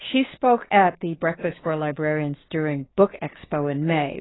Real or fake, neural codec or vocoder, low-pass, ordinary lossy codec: fake; codec, 16 kHz, 6 kbps, DAC; 7.2 kHz; AAC, 16 kbps